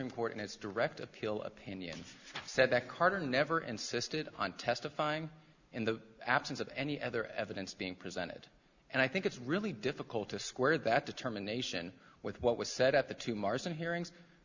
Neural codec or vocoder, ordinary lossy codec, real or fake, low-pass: none; Opus, 64 kbps; real; 7.2 kHz